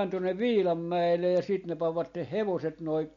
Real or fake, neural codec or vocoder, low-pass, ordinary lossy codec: real; none; 7.2 kHz; MP3, 48 kbps